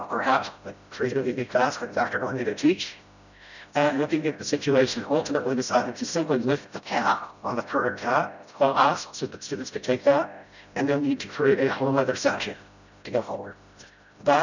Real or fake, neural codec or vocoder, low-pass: fake; codec, 16 kHz, 0.5 kbps, FreqCodec, smaller model; 7.2 kHz